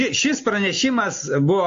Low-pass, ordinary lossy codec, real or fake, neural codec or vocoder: 7.2 kHz; AAC, 48 kbps; real; none